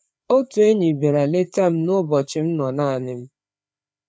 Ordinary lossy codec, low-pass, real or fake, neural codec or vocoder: none; none; fake; codec, 16 kHz, 4 kbps, FreqCodec, larger model